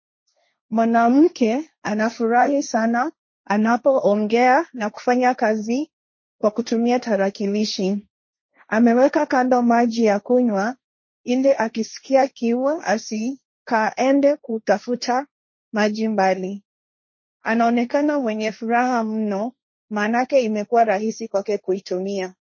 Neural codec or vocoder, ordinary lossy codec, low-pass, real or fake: codec, 16 kHz, 1.1 kbps, Voila-Tokenizer; MP3, 32 kbps; 7.2 kHz; fake